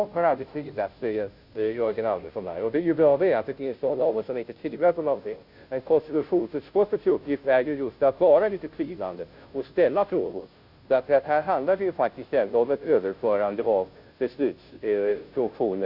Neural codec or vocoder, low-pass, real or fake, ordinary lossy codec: codec, 16 kHz, 0.5 kbps, FunCodec, trained on Chinese and English, 25 frames a second; 5.4 kHz; fake; Opus, 64 kbps